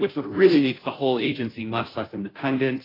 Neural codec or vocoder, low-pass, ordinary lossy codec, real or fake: codec, 16 kHz, 0.5 kbps, FunCodec, trained on Chinese and English, 25 frames a second; 5.4 kHz; AAC, 24 kbps; fake